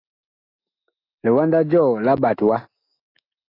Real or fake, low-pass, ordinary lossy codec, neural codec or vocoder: fake; 5.4 kHz; AAC, 32 kbps; autoencoder, 48 kHz, 128 numbers a frame, DAC-VAE, trained on Japanese speech